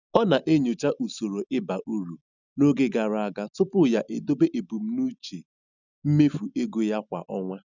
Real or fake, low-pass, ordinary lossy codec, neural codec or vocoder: real; 7.2 kHz; none; none